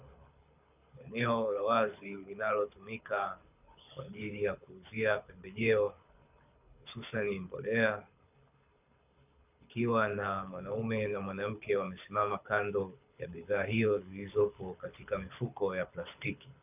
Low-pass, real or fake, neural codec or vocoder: 3.6 kHz; fake; codec, 24 kHz, 6 kbps, HILCodec